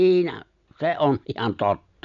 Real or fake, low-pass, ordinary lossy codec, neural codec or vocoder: real; 7.2 kHz; none; none